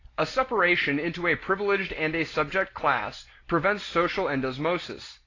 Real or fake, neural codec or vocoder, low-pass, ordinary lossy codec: real; none; 7.2 kHz; AAC, 32 kbps